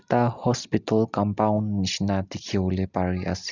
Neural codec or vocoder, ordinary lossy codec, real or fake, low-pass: none; none; real; 7.2 kHz